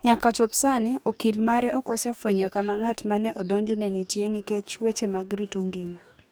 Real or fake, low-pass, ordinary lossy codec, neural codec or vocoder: fake; none; none; codec, 44.1 kHz, 2.6 kbps, DAC